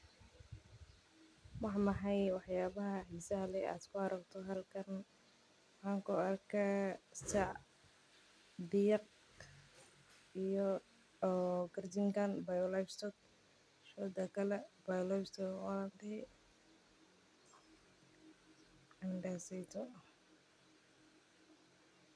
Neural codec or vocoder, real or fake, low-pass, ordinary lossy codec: none; real; none; none